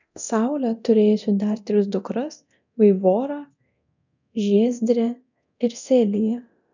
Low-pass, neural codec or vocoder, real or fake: 7.2 kHz; codec, 24 kHz, 0.9 kbps, DualCodec; fake